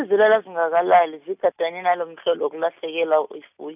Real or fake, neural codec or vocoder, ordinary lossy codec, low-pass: real; none; none; 3.6 kHz